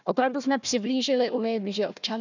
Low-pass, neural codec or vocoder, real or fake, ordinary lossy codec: 7.2 kHz; codec, 16 kHz, 1 kbps, FunCodec, trained on Chinese and English, 50 frames a second; fake; none